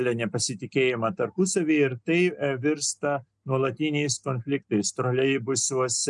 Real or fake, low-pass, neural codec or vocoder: real; 10.8 kHz; none